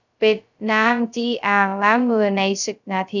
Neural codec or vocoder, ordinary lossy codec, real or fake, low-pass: codec, 16 kHz, 0.2 kbps, FocalCodec; none; fake; 7.2 kHz